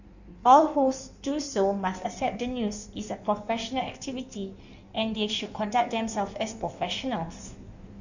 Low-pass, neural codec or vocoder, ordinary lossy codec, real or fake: 7.2 kHz; codec, 16 kHz in and 24 kHz out, 1.1 kbps, FireRedTTS-2 codec; none; fake